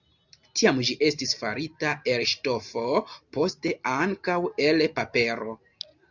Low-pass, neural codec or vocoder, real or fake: 7.2 kHz; none; real